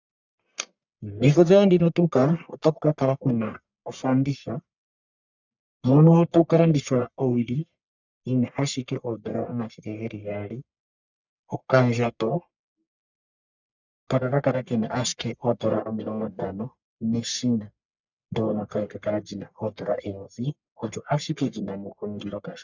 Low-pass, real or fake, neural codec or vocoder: 7.2 kHz; fake; codec, 44.1 kHz, 1.7 kbps, Pupu-Codec